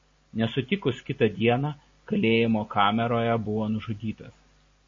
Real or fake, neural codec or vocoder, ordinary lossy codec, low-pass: real; none; MP3, 32 kbps; 7.2 kHz